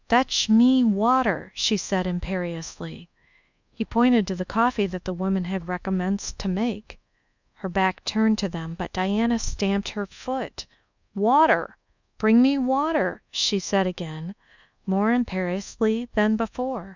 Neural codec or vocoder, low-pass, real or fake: codec, 24 kHz, 1.2 kbps, DualCodec; 7.2 kHz; fake